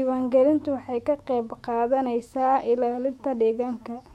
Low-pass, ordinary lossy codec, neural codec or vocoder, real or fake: 19.8 kHz; MP3, 64 kbps; autoencoder, 48 kHz, 128 numbers a frame, DAC-VAE, trained on Japanese speech; fake